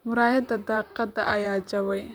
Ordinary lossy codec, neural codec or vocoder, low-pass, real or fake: none; vocoder, 44.1 kHz, 128 mel bands, Pupu-Vocoder; none; fake